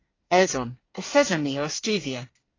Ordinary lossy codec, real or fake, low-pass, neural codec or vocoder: AAC, 32 kbps; fake; 7.2 kHz; codec, 24 kHz, 1 kbps, SNAC